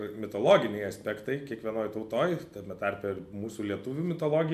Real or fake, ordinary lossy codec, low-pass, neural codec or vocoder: real; MP3, 96 kbps; 14.4 kHz; none